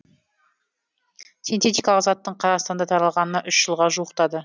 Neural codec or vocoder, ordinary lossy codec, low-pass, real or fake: none; none; none; real